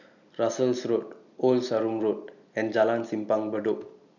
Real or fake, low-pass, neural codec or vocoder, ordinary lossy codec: real; 7.2 kHz; none; none